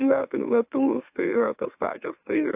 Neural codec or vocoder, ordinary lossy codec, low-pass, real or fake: autoencoder, 44.1 kHz, a latent of 192 numbers a frame, MeloTTS; AAC, 32 kbps; 3.6 kHz; fake